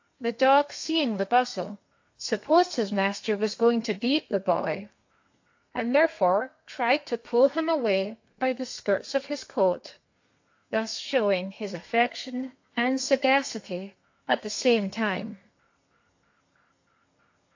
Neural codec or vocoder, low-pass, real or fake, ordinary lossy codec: codec, 32 kHz, 1.9 kbps, SNAC; 7.2 kHz; fake; AAC, 48 kbps